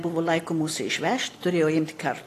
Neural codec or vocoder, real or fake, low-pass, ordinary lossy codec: none; real; 14.4 kHz; AAC, 48 kbps